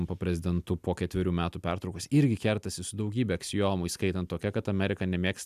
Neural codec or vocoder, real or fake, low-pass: none; real; 14.4 kHz